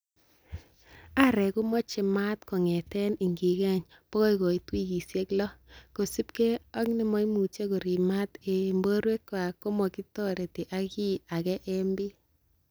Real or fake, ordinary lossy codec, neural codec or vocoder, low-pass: real; none; none; none